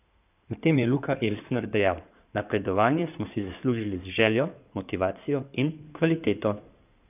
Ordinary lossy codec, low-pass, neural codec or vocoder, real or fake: none; 3.6 kHz; codec, 16 kHz in and 24 kHz out, 2.2 kbps, FireRedTTS-2 codec; fake